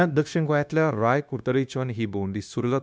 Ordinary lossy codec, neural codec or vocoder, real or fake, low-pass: none; codec, 16 kHz, 0.9 kbps, LongCat-Audio-Codec; fake; none